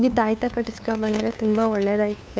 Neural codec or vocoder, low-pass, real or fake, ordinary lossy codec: codec, 16 kHz, 2 kbps, FunCodec, trained on LibriTTS, 25 frames a second; none; fake; none